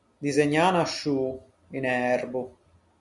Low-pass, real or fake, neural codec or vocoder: 10.8 kHz; real; none